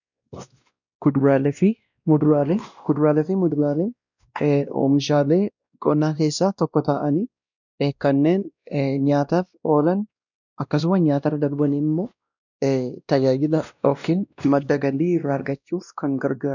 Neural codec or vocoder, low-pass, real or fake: codec, 16 kHz, 1 kbps, X-Codec, WavLM features, trained on Multilingual LibriSpeech; 7.2 kHz; fake